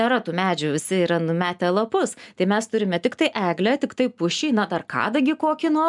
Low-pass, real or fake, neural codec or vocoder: 10.8 kHz; real; none